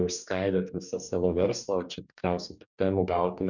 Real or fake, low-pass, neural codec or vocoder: fake; 7.2 kHz; codec, 44.1 kHz, 2.6 kbps, DAC